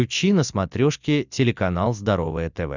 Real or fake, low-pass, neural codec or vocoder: real; 7.2 kHz; none